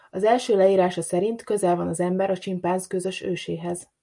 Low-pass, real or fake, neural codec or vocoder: 10.8 kHz; real; none